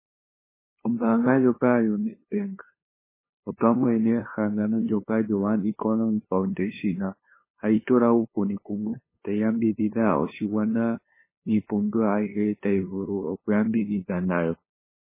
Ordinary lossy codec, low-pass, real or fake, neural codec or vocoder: MP3, 16 kbps; 3.6 kHz; fake; codec, 16 kHz, 2 kbps, FunCodec, trained on LibriTTS, 25 frames a second